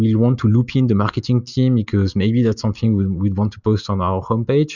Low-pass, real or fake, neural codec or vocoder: 7.2 kHz; real; none